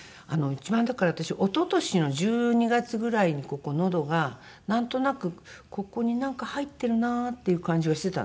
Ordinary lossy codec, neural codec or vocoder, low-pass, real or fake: none; none; none; real